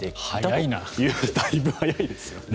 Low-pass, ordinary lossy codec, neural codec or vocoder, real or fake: none; none; none; real